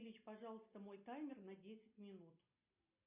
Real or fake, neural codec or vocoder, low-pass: real; none; 3.6 kHz